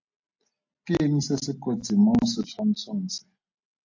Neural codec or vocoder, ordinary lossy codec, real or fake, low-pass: none; AAC, 48 kbps; real; 7.2 kHz